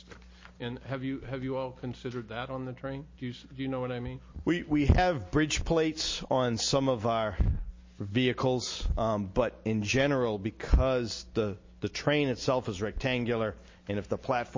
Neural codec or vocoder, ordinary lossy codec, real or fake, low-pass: none; MP3, 32 kbps; real; 7.2 kHz